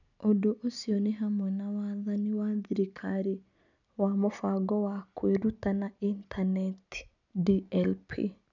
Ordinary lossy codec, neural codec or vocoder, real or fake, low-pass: none; none; real; 7.2 kHz